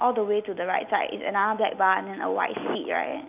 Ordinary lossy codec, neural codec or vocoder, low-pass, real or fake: none; none; 3.6 kHz; real